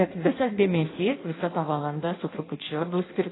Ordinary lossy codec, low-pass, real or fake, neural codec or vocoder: AAC, 16 kbps; 7.2 kHz; fake; codec, 16 kHz in and 24 kHz out, 0.6 kbps, FireRedTTS-2 codec